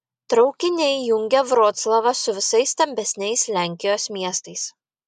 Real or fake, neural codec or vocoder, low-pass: real; none; 14.4 kHz